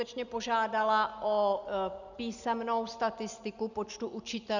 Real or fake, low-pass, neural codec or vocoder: real; 7.2 kHz; none